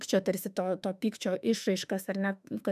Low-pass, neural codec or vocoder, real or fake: 14.4 kHz; autoencoder, 48 kHz, 32 numbers a frame, DAC-VAE, trained on Japanese speech; fake